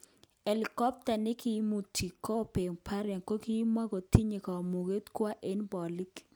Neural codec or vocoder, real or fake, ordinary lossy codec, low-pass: none; real; none; none